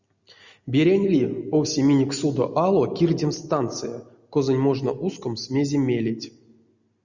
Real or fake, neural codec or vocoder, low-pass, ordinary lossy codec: real; none; 7.2 kHz; Opus, 64 kbps